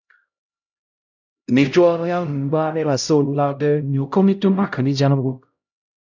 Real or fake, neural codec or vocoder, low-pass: fake; codec, 16 kHz, 0.5 kbps, X-Codec, HuBERT features, trained on LibriSpeech; 7.2 kHz